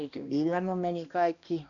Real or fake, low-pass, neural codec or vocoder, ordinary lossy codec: fake; 7.2 kHz; codec, 16 kHz, 1 kbps, X-Codec, HuBERT features, trained on balanced general audio; none